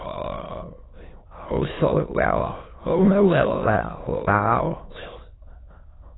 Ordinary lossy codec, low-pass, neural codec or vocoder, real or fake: AAC, 16 kbps; 7.2 kHz; autoencoder, 22.05 kHz, a latent of 192 numbers a frame, VITS, trained on many speakers; fake